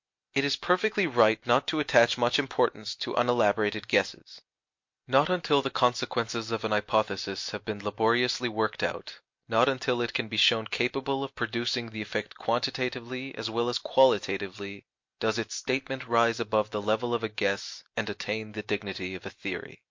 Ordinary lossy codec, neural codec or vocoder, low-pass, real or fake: MP3, 48 kbps; none; 7.2 kHz; real